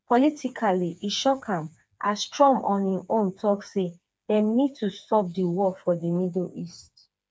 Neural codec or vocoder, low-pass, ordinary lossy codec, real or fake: codec, 16 kHz, 4 kbps, FreqCodec, smaller model; none; none; fake